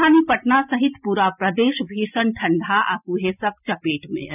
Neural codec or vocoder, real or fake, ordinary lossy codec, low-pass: none; real; none; 3.6 kHz